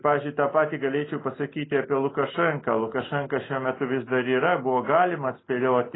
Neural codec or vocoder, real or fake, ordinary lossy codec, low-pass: none; real; AAC, 16 kbps; 7.2 kHz